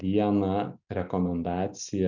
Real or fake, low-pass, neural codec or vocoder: real; 7.2 kHz; none